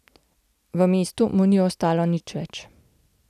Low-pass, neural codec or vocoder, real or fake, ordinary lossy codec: 14.4 kHz; none; real; none